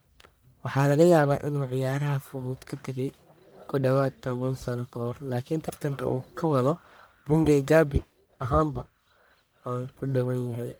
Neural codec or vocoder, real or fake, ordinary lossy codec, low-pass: codec, 44.1 kHz, 1.7 kbps, Pupu-Codec; fake; none; none